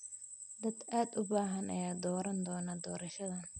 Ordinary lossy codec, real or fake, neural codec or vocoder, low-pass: none; real; none; 10.8 kHz